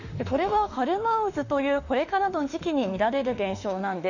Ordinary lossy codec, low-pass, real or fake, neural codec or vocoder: none; 7.2 kHz; fake; autoencoder, 48 kHz, 32 numbers a frame, DAC-VAE, trained on Japanese speech